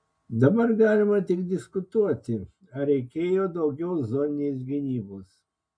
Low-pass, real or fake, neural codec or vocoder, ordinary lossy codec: 9.9 kHz; real; none; MP3, 64 kbps